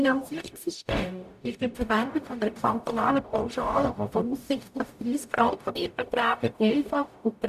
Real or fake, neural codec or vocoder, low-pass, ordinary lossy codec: fake; codec, 44.1 kHz, 0.9 kbps, DAC; 14.4 kHz; none